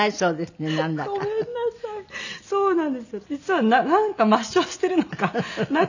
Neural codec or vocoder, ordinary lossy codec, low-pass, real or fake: none; none; 7.2 kHz; real